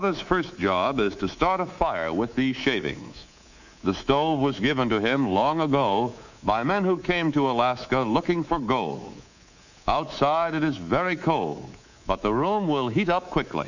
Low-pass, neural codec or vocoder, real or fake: 7.2 kHz; codec, 24 kHz, 3.1 kbps, DualCodec; fake